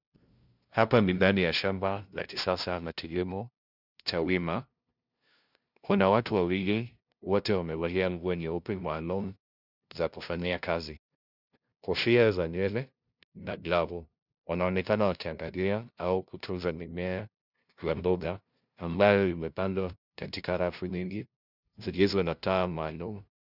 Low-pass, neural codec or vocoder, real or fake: 5.4 kHz; codec, 16 kHz, 0.5 kbps, FunCodec, trained on LibriTTS, 25 frames a second; fake